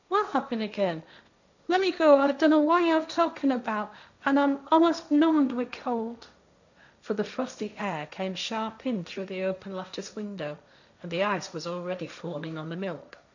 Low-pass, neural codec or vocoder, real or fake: 7.2 kHz; codec, 16 kHz, 1.1 kbps, Voila-Tokenizer; fake